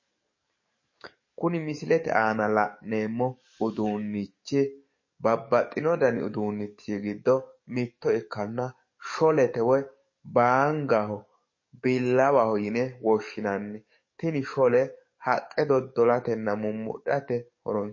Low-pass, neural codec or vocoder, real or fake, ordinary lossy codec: 7.2 kHz; codec, 44.1 kHz, 7.8 kbps, DAC; fake; MP3, 32 kbps